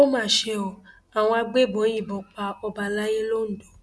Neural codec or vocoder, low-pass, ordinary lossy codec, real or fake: none; none; none; real